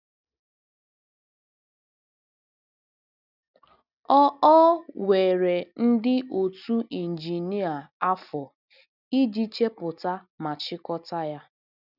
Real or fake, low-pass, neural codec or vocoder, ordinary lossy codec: real; 5.4 kHz; none; none